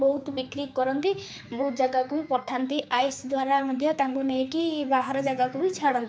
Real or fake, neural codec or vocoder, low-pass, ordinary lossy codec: fake; codec, 16 kHz, 4 kbps, X-Codec, HuBERT features, trained on general audio; none; none